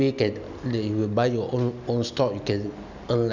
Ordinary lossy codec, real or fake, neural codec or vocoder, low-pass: none; real; none; 7.2 kHz